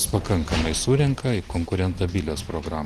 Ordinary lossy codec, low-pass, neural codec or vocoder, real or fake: Opus, 24 kbps; 14.4 kHz; none; real